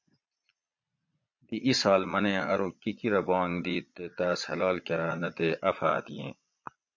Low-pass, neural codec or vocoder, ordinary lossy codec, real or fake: 7.2 kHz; vocoder, 22.05 kHz, 80 mel bands, Vocos; MP3, 48 kbps; fake